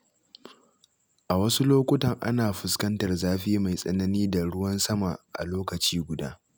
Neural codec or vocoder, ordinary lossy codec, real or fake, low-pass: none; none; real; none